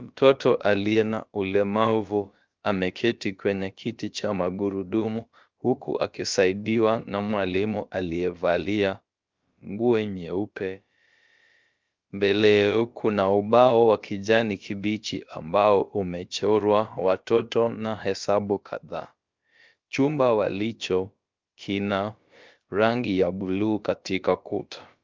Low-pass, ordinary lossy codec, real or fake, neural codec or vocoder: 7.2 kHz; Opus, 32 kbps; fake; codec, 16 kHz, about 1 kbps, DyCAST, with the encoder's durations